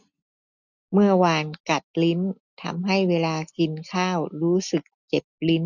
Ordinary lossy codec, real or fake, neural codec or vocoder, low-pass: none; real; none; 7.2 kHz